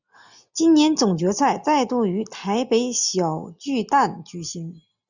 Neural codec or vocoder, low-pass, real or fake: none; 7.2 kHz; real